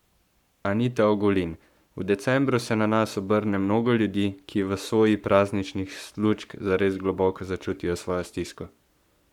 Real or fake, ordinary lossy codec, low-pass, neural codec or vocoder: fake; none; 19.8 kHz; codec, 44.1 kHz, 7.8 kbps, Pupu-Codec